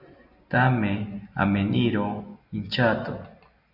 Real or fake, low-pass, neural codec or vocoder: real; 5.4 kHz; none